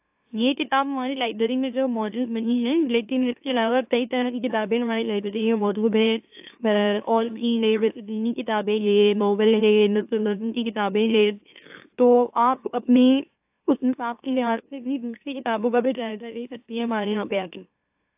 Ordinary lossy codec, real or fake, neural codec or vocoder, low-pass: AAC, 32 kbps; fake; autoencoder, 44.1 kHz, a latent of 192 numbers a frame, MeloTTS; 3.6 kHz